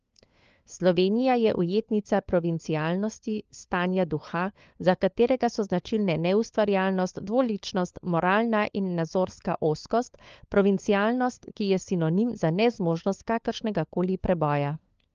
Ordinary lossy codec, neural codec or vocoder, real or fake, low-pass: Opus, 24 kbps; codec, 16 kHz, 4 kbps, FunCodec, trained on LibriTTS, 50 frames a second; fake; 7.2 kHz